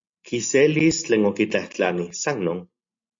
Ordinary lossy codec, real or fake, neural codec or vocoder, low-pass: MP3, 48 kbps; real; none; 7.2 kHz